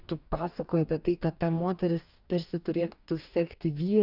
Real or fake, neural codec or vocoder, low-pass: fake; codec, 44.1 kHz, 2.6 kbps, DAC; 5.4 kHz